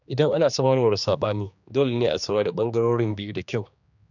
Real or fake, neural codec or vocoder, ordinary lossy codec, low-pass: fake; codec, 16 kHz, 2 kbps, X-Codec, HuBERT features, trained on general audio; none; 7.2 kHz